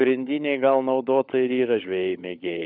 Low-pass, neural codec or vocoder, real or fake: 5.4 kHz; codec, 16 kHz, 16 kbps, FunCodec, trained on LibriTTS, 50 frames a second; fake